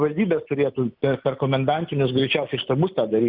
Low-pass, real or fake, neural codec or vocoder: 5.4 kHz; real; none